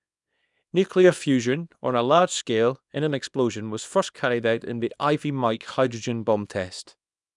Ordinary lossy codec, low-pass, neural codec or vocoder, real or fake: none; 10.8 kHz; codec, 24 kHz, 0.9 kbps, WavTokenizer, small release; fake